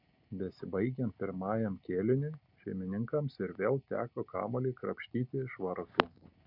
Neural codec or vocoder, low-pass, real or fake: none; 5.4 kHz; real